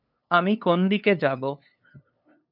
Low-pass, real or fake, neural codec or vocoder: 5.4 kHz; fake; codec, 16 kHz, 2 kbps, FunCodec, trained on LibriTTS, 25 frames a second